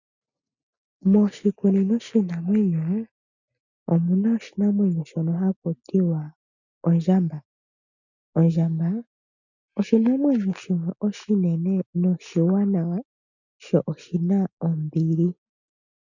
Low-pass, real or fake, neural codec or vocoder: 7.2 kHz; real; none